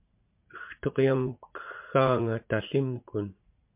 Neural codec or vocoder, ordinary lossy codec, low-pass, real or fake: vocoder, 44.1 kHz, 80 mel bands, Vocos; MP3, 32 kbps; 3.6 kHz; fake